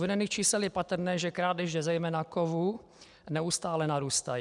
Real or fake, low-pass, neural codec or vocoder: real; 10.8 kHz; none